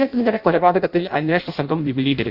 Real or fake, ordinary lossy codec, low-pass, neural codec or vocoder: fake; Opus, 64 kbps; 5.4 kHz; codec, 16 kHz in and 24 kHz out, 0.6 kbps, FireRedTTS-2 codec